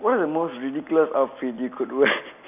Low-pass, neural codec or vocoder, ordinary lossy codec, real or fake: 3.6 kHz; none; none; real